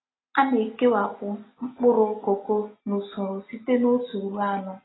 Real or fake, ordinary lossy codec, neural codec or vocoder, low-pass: real; AAC, 16 kbps; none; 7.2 kHz